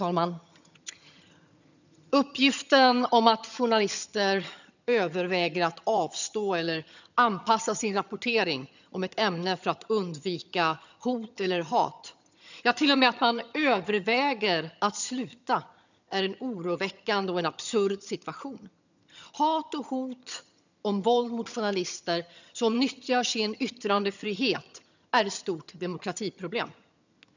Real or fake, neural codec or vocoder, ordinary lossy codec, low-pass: fake; vocoder, 22.05 kHz, 80 mel bands, HiFi-GAN; none; 7.2 kHz